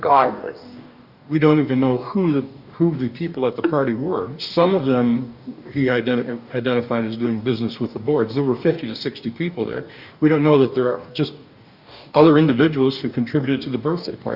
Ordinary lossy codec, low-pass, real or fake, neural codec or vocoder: Opus, 64 kbps; 5.4 kHz; fake; codec, 44.1 kHz, 2.6 kbps, DAC